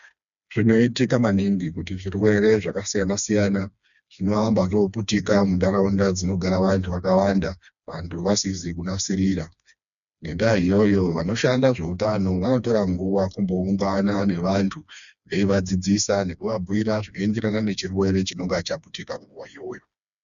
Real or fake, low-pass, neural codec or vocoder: fake; 7.2 kHz; codec, 16 kHz, 2 kbps, FreqCodec, smaller model